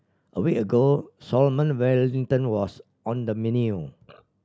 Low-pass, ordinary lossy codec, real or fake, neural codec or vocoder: none; none; real; none